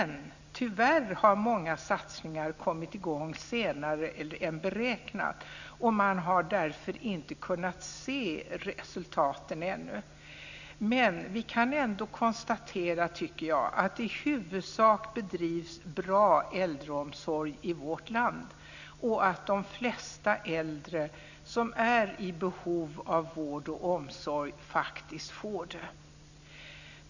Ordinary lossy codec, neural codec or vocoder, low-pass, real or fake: none; none; 7.2 kHz; real